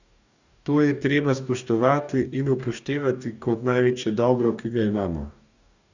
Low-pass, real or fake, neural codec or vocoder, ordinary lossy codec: 7.2 kHz; fake; codec, 44.1 kHz, 2.6 kbps, DAC; none